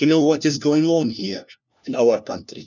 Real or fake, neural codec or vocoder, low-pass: fake; codec, 16 kHz, 1 kbps, FunCodec, trained on LibriTTS, 50 frames a second; 7.2 kHz